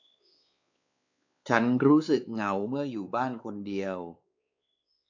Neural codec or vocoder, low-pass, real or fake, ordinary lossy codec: codec, 16 kHz, 4 kbps, X-Codec, WavLM features, trained on Multilingual LibriSpeech; 7.2 kHz; fake; AAC, 48 kbps